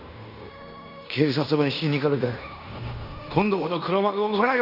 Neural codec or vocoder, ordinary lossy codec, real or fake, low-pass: codec, 16 kHz in and 24 kHz out, 0.9 kbps, LongCat-Audio-Codec, fine tuned four codebook decoder; none; fake; 5.4 kHz